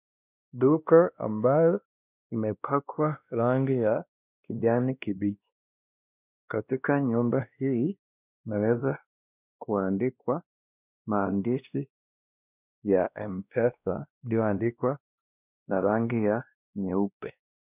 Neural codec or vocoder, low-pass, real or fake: codec, 16 kHz, 1 kbps, X-Codec, WavLM features, trained on Multilingual LibriSpeech; 3.6 kHz; fake